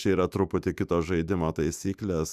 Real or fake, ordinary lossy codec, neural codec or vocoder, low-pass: fake; Opus, 64 kbps; autoencoder, 48 kHz, 128 numbers a frame, DAC-VAE, trained on Japanese speech; 14.4 kHz